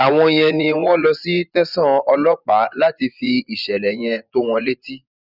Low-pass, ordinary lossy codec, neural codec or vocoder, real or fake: 5.4 kHz; none; vocoder, 24 kHz, 100 mel bands, Vocos; fake